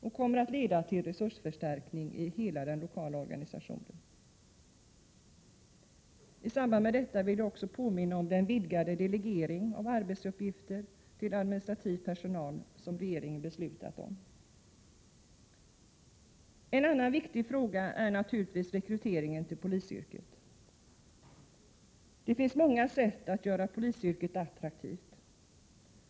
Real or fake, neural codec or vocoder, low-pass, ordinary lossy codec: real; none; none; none